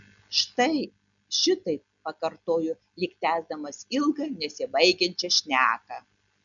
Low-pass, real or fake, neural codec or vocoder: 7.2 kHz; real; none